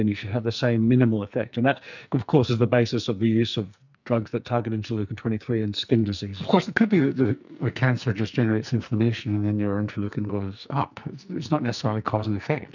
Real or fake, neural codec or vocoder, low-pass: fake; codec, 44.1 kHz, 2.6 kbps, SNAC; 7.2 kHz